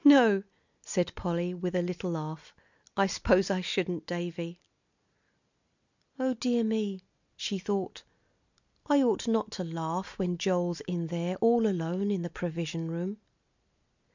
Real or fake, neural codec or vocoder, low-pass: real; none; 7.2 kHz